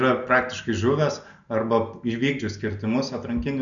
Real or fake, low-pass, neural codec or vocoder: real; 7.2 kHz; none